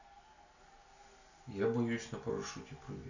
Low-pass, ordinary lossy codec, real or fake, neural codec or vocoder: 7.2 kHz; none; real; none